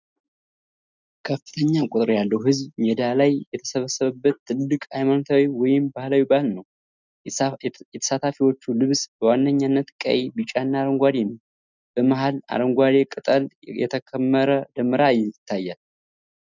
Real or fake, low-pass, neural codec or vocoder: real; 7.2 kHz; none